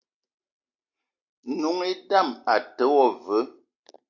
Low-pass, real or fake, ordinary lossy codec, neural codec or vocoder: 7.2 kHz; real; AAC, 48 kbps; none